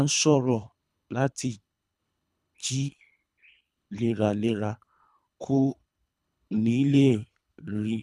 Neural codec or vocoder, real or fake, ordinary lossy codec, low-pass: codec, 24 kHz, 3 kbps, HILCodec; fake; none; none